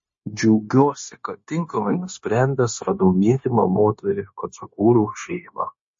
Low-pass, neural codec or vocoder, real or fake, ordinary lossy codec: 7.2 kHz; codec, 16 kHz, 0.9 kbps, LongCat-Audio-Codec; fake; MP3, 32 kbps